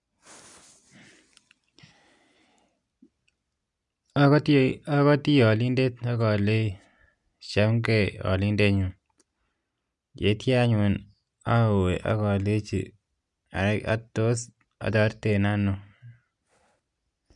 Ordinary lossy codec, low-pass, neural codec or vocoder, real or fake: none; 10.8 kHz; none; real